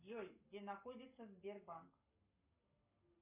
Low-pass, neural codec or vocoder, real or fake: 3.6 kHz; codec, 44.1 kHz, 7.8 kbps, Pupu-Codec; fake